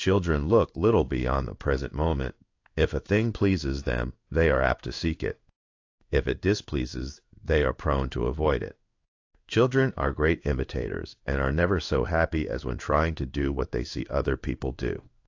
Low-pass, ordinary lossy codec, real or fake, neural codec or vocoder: 7.2 kHz; AAC, 48 kbps; fake; codec, 16 kHz in and 24 kHz out, 1 kbps, XY-Tokenizer